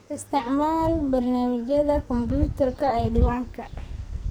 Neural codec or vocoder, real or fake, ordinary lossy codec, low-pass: codec, 44.1 kHz, 2.6 kbps, SNAC; fake; none; none